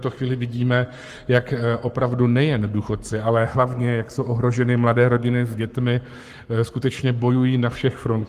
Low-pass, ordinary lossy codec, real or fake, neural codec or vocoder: 14.4 kHz; Opus, 24 kbps; fake; codec, 44.1 kHz, 7.8 kbps, Pupu-Codec